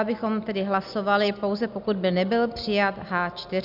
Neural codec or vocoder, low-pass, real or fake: none; 5.4 kHz; real